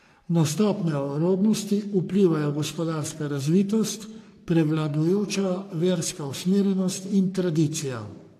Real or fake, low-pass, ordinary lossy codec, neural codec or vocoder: fake; 14.4 kHz; AAC, 64 kbps; codec, 44.1 kHz, 3.4 kbps, Pupu-Codec